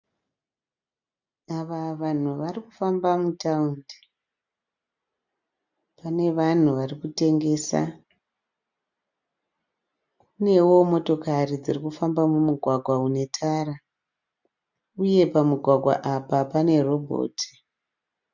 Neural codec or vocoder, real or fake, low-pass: none; real; 7.2 kHz